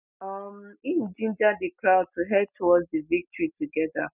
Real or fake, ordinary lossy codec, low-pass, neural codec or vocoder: real; none; 3.6 kHz; none